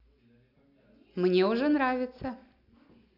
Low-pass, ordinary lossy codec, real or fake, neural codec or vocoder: 5.4 kHz; none; real; none